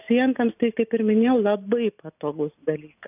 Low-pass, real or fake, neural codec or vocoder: 3.6 kHz; real; none